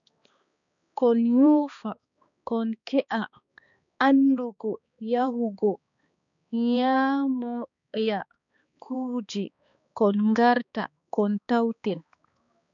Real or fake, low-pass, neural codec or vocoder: fake; 7.2 kHz; codec, 16 kHz, 2 kbps, X-Codec, HuBERT features, trained on balanced general audio